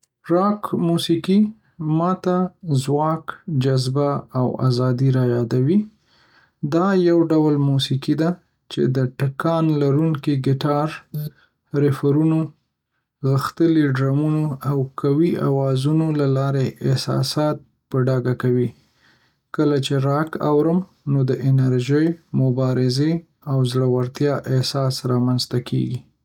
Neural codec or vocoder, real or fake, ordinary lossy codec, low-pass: none; real; none; 19.8 kHz